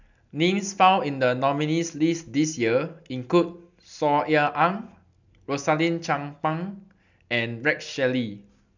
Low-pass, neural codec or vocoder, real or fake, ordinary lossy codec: 7.2 kHz; none; real; none